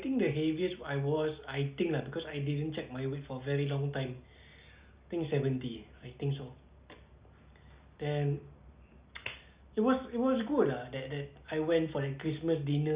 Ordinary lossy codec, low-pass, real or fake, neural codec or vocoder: Opus, 64 kbps; 3.6 kHz; real; none